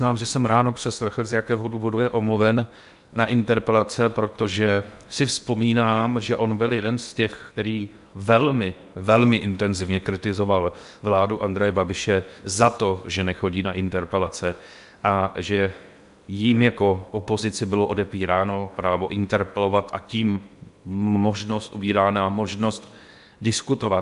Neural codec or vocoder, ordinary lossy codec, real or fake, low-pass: codec, 16 kHz in and 24 kHz out, 0.8 kbps, FocalCodec, streaming, 65536 codes; MP3, 96 kbps; fake; 10.8 kHz